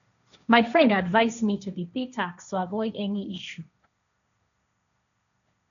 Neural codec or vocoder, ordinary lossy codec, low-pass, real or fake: codec, 16 kHz, 1.1 kbps, Voila-Tokenizer; none; 7.2 kHz; fake